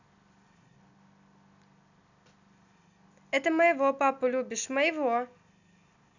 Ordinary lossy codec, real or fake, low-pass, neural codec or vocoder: none; real; 7.2 kHz; none